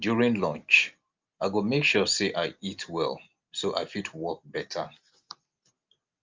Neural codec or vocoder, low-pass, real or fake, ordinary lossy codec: none; 7.2 kHz; real; Opus, 24 kbps